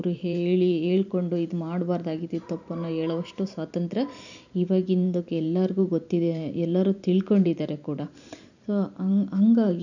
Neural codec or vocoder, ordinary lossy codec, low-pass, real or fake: vocoder, 44.1 kHz, 128 mel bands every 512 samples, BigVGAN v2; none; 7.2 kHz; fake